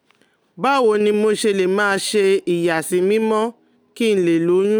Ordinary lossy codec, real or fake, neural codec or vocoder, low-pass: none; real; none; none